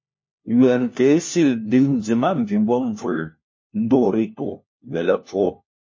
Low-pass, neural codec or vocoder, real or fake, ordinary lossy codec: 7.2 kHz; codec, 16 kHz, 1 kbps, FunCodec, trained on LibriTTS, 50 frames a second; fake; MP3, 32 kbps